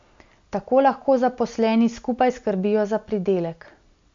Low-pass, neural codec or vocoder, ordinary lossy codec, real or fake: 7.2 kHz; none; MP3, 48 kbps; real